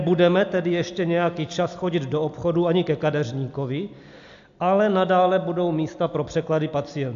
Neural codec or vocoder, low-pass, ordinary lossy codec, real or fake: none; 7.2 kHz; AAC, 64 kbps; real